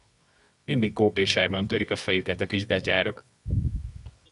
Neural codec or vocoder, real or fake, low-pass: codec, 24 kHz, 0.9 kbps, WavTokenizer, medium music audio release; fake; 10.8 kHz